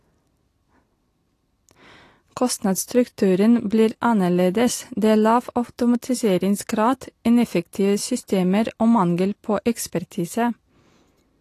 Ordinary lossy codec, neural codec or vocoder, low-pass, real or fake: AAC, 48 kbps; none; 14.4 kHz; real